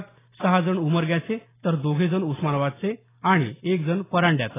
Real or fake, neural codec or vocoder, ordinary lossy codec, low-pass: real; none; AAC, 16 kbps; 3.6 kHz